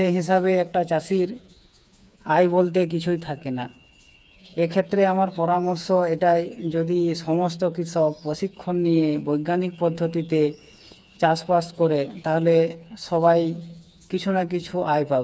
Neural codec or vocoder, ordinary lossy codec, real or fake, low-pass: codec, 16 kHz, 4 kbps, FreqCodec, smaller model; none; fake; none